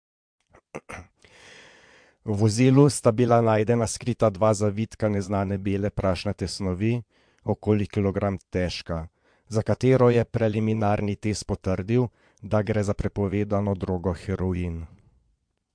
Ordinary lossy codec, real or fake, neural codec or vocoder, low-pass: MP3, 64 kbps; fake; vocoder, 22.05 kHz, 80 mel bands, Vocos; 9.9 kHz